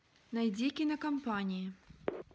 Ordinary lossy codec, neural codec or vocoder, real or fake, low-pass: none; none; real; none